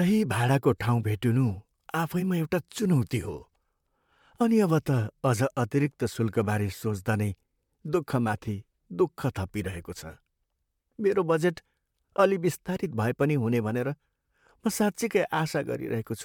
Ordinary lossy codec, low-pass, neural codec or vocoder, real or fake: MP3, 96 kbps; 19.8 kHz; vocoder, 44.1 kHz, 128 mel bands, Pupu-Vocoder; fake